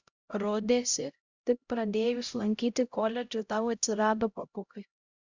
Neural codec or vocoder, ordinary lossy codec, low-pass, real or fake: codec, 16 kHz, 0.5 kbps, X-Codec, HuBERT features, trained on LibriSpeech; Opus, 64 kbps; 7.2 kHz; fake